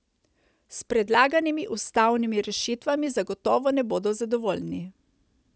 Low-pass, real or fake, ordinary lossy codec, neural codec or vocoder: none; real; none; none